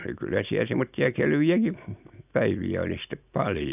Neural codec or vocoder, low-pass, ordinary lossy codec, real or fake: none; 3.6 kHz; none; real